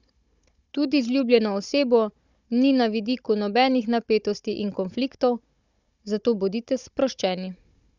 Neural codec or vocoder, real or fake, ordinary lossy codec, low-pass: codec, 16 kHz, 16 kbps, FunCodec, trained on Chinese and English, 50 frames a second; fake; Opus, 64 kbps; 7.2 kHz